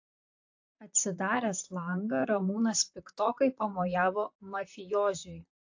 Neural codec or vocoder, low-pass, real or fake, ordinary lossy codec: vocoder, 44.1 kHz, 128 mel bands, Pupu-Vocoder; 7.2 kHz; fake; AAC, 48 kbps